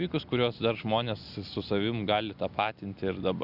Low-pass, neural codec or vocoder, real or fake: 5.4 kHz; none; real